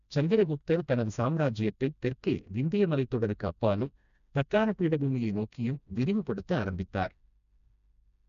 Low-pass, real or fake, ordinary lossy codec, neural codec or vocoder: 7.2 kHz; fake; none; codec, 16 kHz, 1 kbps, FreqCodec, smaller model